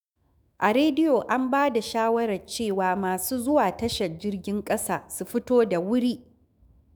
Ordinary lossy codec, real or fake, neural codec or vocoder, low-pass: none; fake; autoencoder, 48 kHz, 128 numbers a frame, DAC-VAE, trained on Japanese speech; none